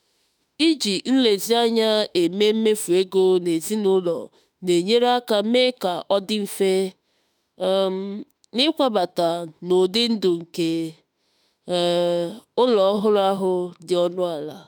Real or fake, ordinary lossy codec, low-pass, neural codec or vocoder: fake; none; none; autoencoder, 48 kHz, 32 numbers a frame, DAC-VAE, trained on Japanese speech